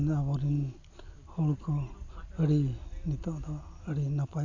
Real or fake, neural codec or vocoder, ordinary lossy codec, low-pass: real; none; AAC, 48 kbps; 7.2 kHz